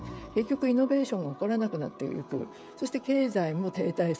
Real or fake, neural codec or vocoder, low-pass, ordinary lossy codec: fake; codec, 16 kHz, 16 kbps, FreqCodec, smaller model; none; none